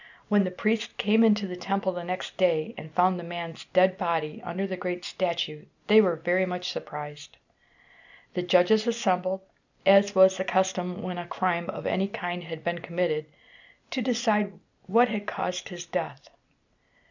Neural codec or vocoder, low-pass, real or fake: none; 7.2 kHz; real